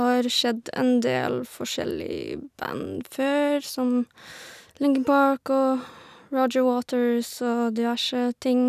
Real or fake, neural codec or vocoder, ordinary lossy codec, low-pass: real; none; none; 14.4 kHz